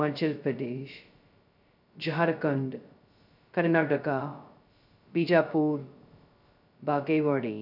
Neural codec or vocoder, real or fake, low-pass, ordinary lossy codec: codec, 16 kHz, 0.2 kbps, FocalCodec; fake; 5.4 kHz; none